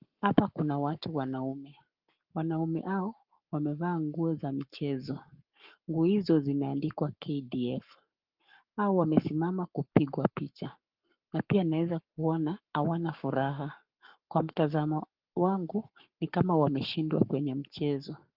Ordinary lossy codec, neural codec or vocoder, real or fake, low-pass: Opus, 24 kbps; codec, 44.1 kHz, 7.8 kbps, Pupu-Codec; fake; 5.4 kHz